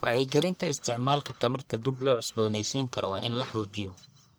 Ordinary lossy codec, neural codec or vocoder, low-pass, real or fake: none; codec, 44.1 kHz, 1.7 kbps, Pupu-Codec; none; fake